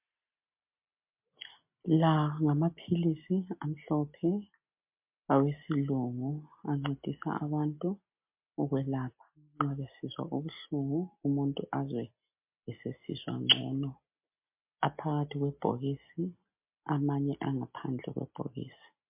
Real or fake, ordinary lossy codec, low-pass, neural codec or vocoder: real; MP3, 32 kbps; 3.6 kHz; none